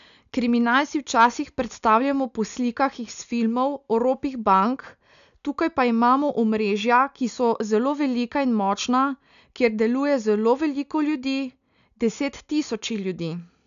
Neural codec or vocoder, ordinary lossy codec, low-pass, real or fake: none; none; 7.2 kHz; real